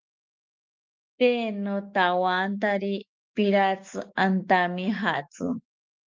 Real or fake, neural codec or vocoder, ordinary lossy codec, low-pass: fake; autoencoder, 48 kHz, 128 numbers a frame, DAC-VAE, trained on Japanese speech; Opus, 32 kbps; 7.2 kHz